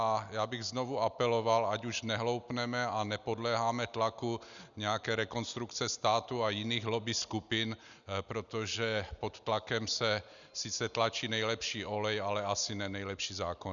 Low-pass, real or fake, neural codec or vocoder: 7.2 kHz; real; none